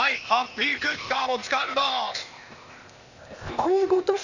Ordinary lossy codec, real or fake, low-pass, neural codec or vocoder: none; fake; 7.2 kHz; codec, 16 kHz, 0.8 kbps, ZipCodec